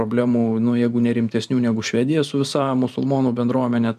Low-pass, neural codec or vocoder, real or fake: 14.4 kHz; vocoder, 44.1 kHz, 128 mel bands every 512 samples, BigVGAN v2; fake